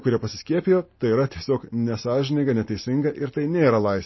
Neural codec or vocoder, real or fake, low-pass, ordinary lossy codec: none; real; 7.2 kHz; MP3, 24 kbps